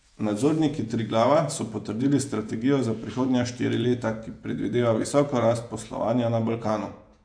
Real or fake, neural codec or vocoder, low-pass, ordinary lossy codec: fake; vocoder, 48 kHz, 128 mel bands, Vocos; 9.9 kHz; AAC, 64 kbps